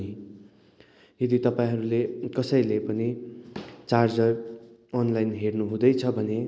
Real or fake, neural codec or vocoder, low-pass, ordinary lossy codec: real; none; none; none